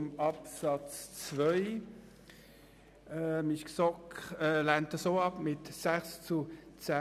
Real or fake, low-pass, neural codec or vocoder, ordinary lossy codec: real; 14.4 kHz; none; none